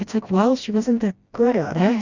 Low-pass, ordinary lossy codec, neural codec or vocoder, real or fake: 7.2 kHz; Opus, 64 kbps; codec, 16 kHz, 1 kbps, FreqCodec, smaller model; fake